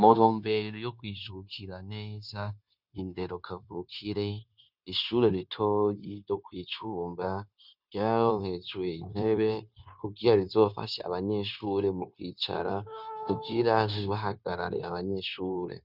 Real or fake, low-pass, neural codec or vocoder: fake; 5.4 kHz; codec, 16 kHz, 0.9 kbps, LongCat-Audio-Codec